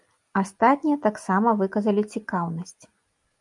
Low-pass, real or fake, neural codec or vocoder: 10.8 kHz; real; none